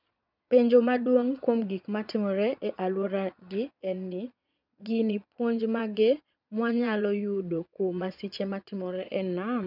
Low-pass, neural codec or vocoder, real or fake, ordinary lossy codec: 5.4 kHz; vocoder, 22.05 kHz, 80 mel bands, Vocos; fake; none